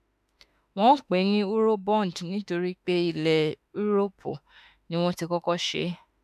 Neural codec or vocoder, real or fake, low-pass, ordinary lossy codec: autoencoder, 48 kHz, 32 numbers a frame, DAC-VAE, trained on Japanese speech; fake; 14.4 kHz; none